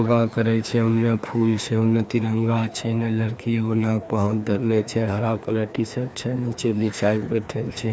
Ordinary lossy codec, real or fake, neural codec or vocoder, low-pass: none; fake; codec, 16 kHz, 2 kbps, FreqCodec, larger model; none